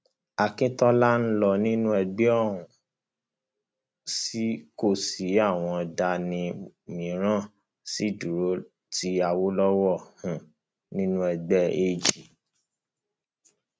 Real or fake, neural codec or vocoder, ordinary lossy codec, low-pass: real; none; none; none